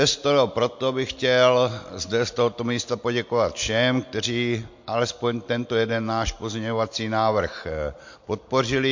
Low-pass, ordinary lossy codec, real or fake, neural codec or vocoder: 7.2 kHz; MP3, 48 kbps; real; none